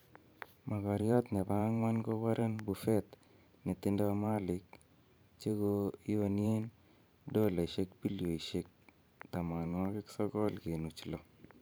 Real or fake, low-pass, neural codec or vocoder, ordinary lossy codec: fake; none; vocoder, 44.1 kHz, 128 mel bands every 512 samples, BigVGAN v2; none